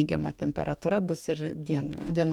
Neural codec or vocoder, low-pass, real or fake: codec, 44.1 kHz, 2.6 kbps, DAC; 19.8 kHz; fake